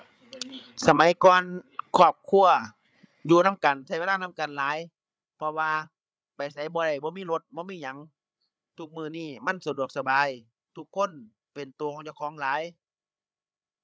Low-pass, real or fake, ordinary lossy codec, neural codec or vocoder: none; fake; none; codec, 16 kHz, 8 kbps, FreqCodec, larger model